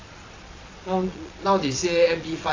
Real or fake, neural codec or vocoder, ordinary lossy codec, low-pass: fake; vocoder, 22.05 kHz, 80 mel bands, Vocos; AAC, 32 kbps; 7.2 kHz